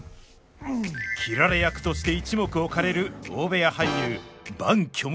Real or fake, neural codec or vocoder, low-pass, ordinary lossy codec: real; none; none; none